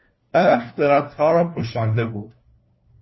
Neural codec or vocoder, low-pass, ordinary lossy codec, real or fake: codec, 16 kHz, 1 kbps, FunCodec, trained on LibriTTS, 50 frames a second; 7.2 kHz; MP3, 24 kbps; fake